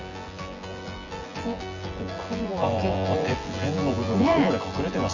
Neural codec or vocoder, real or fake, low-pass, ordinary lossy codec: vocoder, 24 kHz, 100 mel bands, Vocos; fake; 7.2 kHz; Opus, 64 kbps